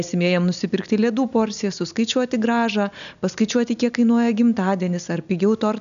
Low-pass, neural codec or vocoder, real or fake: 7.2 kHz; none; real